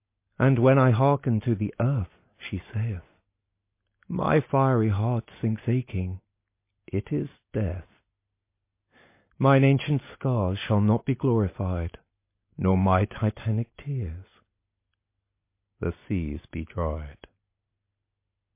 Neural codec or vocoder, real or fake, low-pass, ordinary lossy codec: none; real; 3.6 kHz; MP3, 24 kbps